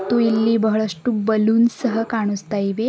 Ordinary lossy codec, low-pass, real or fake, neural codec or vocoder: none; none; real; none